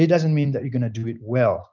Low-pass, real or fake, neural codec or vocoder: 7.2 kHz; real; none